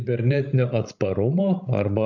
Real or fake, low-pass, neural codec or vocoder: fake; 7.2 kHz; codec, 16 kHz, 16 kbps, FreqCodec, larger model